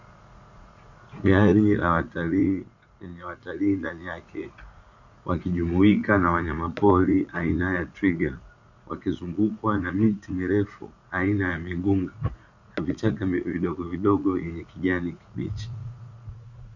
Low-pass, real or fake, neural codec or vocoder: 7.2 kHz; fake; vocoder, 44.1 kHz, 80 mel bands, Vocos